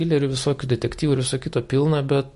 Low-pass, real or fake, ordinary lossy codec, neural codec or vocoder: 14.4 kHz; real; MP3, 48 kbps; none